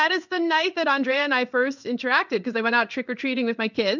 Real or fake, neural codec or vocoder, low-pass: fake; codec, 16 kHz in and 24 kHz out, 1 kbps, XY-Tokenizer; 7.2 kHz